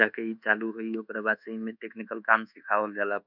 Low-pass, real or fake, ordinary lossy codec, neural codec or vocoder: 5.4 kHz; fake; none; codec, 24 kHz, 1.2 kbps, DualCodec